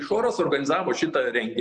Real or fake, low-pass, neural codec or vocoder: real; 9.9 kHz; none